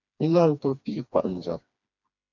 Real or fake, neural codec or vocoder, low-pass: fake; codec, 16 kHz, 2 kbps, FreqCodec, smaller model; 7.2 kHz